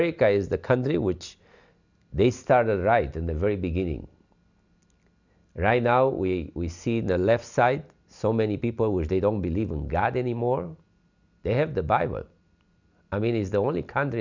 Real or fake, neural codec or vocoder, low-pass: real; none; 7.2 kHz